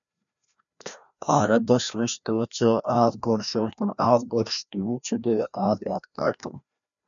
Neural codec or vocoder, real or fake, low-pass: codec, 16 kHz, 1 kbps, FreqCodec, larger model; fake; 7.2 kHz